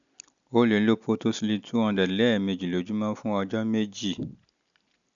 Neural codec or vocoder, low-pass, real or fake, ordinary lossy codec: none; 7.2 kHz; real; none